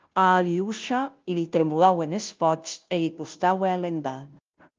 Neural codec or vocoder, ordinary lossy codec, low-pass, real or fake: codec, 16 kHz, 0.5 kbps, FunCodec, trained on Chinese and English, 25 frames a second; Opus, 24 kbps; 7.2 kHz; fake